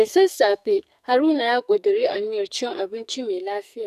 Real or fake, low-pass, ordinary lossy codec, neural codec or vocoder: fake; 14.4 kHz; none; codec, 44.1 kHz, 2.6 kbps, SNAC